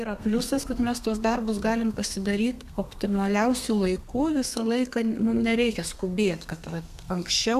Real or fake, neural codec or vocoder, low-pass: fake; codec, 32 kHz, 1.9 kbps, SNAC; 14.4 kHz